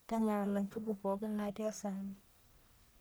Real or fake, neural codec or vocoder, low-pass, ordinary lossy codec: fake; codec, 44.1 kHz, 1.7 kbps, Pupu-Codec; none; none